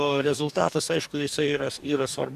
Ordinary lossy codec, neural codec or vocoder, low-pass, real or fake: AAC, 96 kbps; codec, 44.1 kHz, 2.6 kbps, DAC; 14.4 kHz; fake